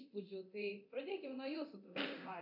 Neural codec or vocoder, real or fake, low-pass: codec, 24 kHz, 0.9 kbps, DualCodec; fake; 5.4 kHz